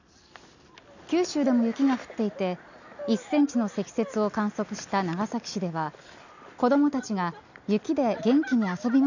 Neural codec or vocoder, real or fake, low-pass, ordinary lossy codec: none; real; 7.2 kHz; none